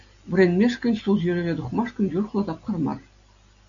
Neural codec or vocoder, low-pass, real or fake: none; 7.2 kHz; real